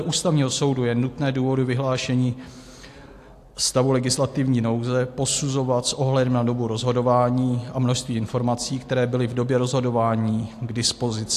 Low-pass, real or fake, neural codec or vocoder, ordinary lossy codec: 14.4 kHz; real; none; AAC, 64 kbps